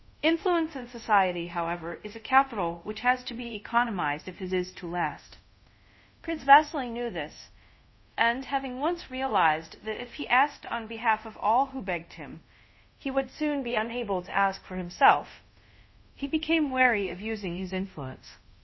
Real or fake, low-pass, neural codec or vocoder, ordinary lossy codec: fake; 7.2 kHz; codec, 24 kHz, 0.5 kbps, DualCodec; MP3, 24 kbps